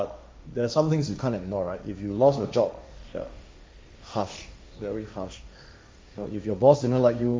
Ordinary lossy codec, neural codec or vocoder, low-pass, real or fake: none; codec, 16 kHz, 1.1 kbps, Voila-Tokenizer; none; fake